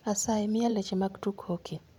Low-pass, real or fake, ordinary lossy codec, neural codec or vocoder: 19.8 kHz; fake; none; vocoder, 44.1 kHz, 128 mel bands every 512 samples, BigVGAN v2